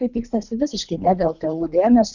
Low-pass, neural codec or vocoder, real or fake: 7.2 kHz; codec, 24 kHz, 3 kbps, HILCodec; fake